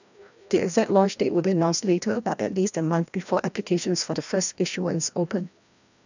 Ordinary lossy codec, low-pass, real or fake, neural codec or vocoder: none; 7.2 kHz; fake; codec, 16 kHz, 1 kbps, FreqCodec, larger model